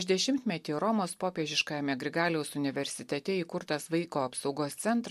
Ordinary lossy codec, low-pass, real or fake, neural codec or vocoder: MP3, 64 kbps; 14.4 kHz; real; none